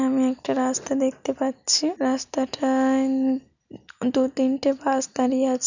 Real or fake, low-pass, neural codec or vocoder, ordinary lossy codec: real; 7.2 kHz; none; none